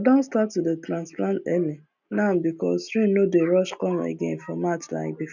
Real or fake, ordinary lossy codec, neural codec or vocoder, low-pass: real; none; none; 7.2 kHz